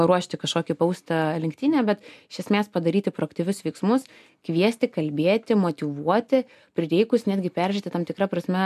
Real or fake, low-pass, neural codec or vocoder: real; 14.4 kHz; none